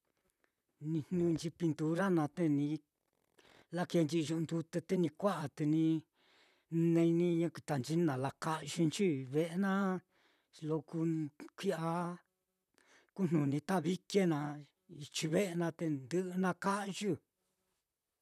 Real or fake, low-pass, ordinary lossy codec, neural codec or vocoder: fake; 14.4 kHz; none; vocoder, 44.1 kHz, 128 mel bands, Pupu-Vocoder